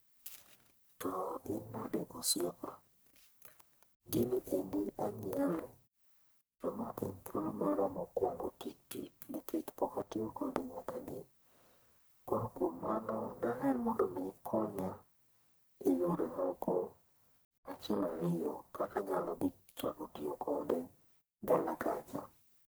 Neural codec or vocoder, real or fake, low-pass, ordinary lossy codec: codec, 44.1 kHz, 1.7 kbps, Pupu-Codec; fake; none; none